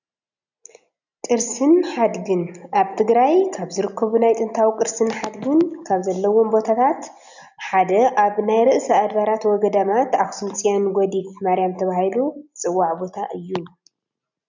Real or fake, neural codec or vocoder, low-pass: real; none; 7.2 kHz